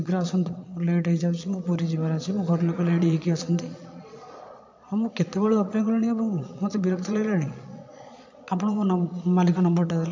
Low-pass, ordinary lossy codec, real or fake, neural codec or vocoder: 7.2 kHz; MP3, 64 kbps; fake; vocoder, 22.05 kHz, 80 mel bands, Vocos